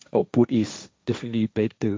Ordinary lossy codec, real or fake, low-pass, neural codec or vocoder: none; fake; none; codec, 16 kHz, 1.1 kbps, Voila-Tokenizer